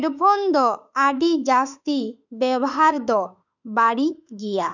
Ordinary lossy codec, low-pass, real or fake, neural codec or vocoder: none; 7.2 kHz; fake; autoencoder, 48 kHz, 32 numbers a frame, DAC-VAE, trained on Japanese speech